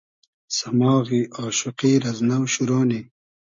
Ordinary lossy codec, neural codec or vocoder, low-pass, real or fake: MP3, 48 kbps; none; 7.2 kHz; real